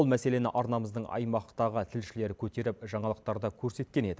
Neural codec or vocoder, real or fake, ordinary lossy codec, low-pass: none; real; none; none